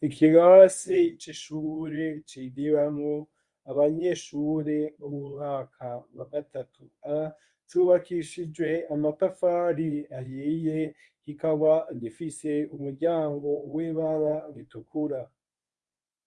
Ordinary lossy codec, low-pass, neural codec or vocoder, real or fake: Opus, 64 kbps; 10.8 kHz; codec, 24 kHz, 0.9 kbps, WavTokenizer, medium speech release version 1; fake